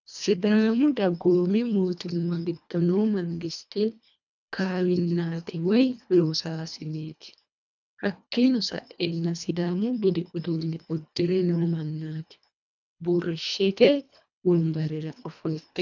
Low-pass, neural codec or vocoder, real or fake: 7.2 kHz; codec, 24 kHz, 1.5 kbps, HILCodec; fake